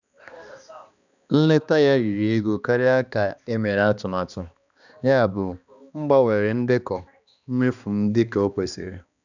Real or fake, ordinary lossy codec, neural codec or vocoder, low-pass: fake; none; codec, 16 kHz, 2 kbps, X-Codec, HuBERT features, trained on balanced general audio; 7.2 kHz